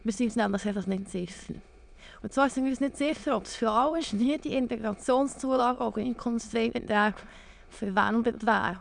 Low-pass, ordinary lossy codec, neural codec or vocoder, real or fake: 9.9 kHz; none; autoencoder, 22.05 kHz, a latent of 192 numbers a frame, VITS, trained on many speakers; fake